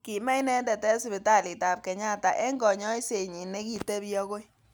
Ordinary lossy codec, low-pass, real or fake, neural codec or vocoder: none; none; real; none